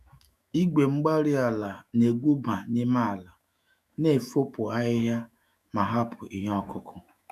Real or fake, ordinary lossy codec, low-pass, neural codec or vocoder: fake; none; 14.4 kHz; autoencoder, 48 kHz, 128 numbers a frame, DAC-VAE, trained on Japanese speech